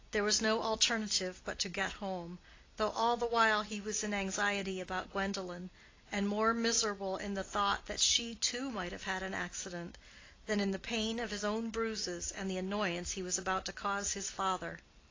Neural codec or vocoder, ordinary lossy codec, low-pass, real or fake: none; AAC, 32 kbps; 7.2 kHz; real